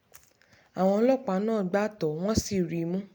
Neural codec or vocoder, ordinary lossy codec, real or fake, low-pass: none; MP3, 96 kbps; real; 19.8 kHz